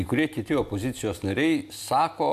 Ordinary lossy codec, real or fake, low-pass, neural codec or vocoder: MP3, 96 kbps; real; 14.4 kHz; none